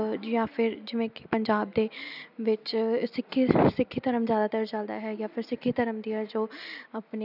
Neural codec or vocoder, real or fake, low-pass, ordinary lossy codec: none; real; 5.4 kHz; none